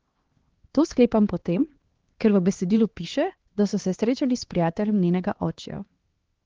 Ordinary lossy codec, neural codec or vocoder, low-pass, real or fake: Opus, 16 kbps; codec, 16 kHz, 2 kbps, X-Codec, HuBERT features, trained on LibriSpeech; 7.2 kHz; fake